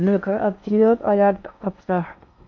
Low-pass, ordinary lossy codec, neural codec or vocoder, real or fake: 7.2 kHz; MP3, 48 kbps; codec, 16 kHz in and 24 kHz out, 0.6 kbps, FocalCodec, streaming, 4096 codes; fake